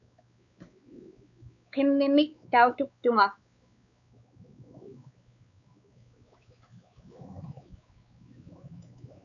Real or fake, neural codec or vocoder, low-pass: fake; codec, 16 kHz, 4 kbps, X-Codec, WavLM features, trained on Multilingual LibriSpeech; 7.2 kHz